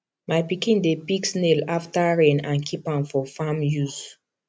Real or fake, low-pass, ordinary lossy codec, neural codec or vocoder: real; none; none; none